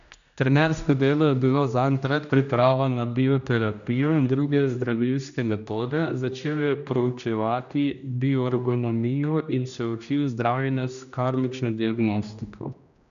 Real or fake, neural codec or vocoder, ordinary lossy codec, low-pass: fake; codec, 16 kHz, 1 kbps, X-Codec, HuBERT features, trained on general audio; none; 7.2 kHz